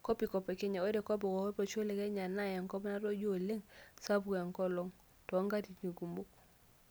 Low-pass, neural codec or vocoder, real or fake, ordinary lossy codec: none; none; real; none